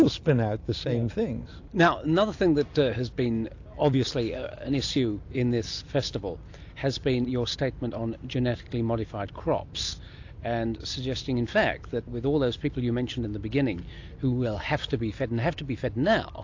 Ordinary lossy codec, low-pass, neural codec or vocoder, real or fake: AAC, 48 kbps; 7.2 kHz; none; real